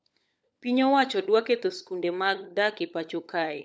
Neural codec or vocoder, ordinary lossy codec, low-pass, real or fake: codec, 16 kHz, 16 kbps, FunCodec, trained on LibriTTS, 50 frames a second; none; none; fake